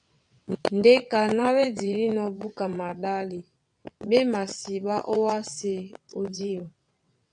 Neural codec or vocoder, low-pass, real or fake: vocoder, 22.05 kHz, 80 mel bands, WaveNeXt; 9.9 kHz; fake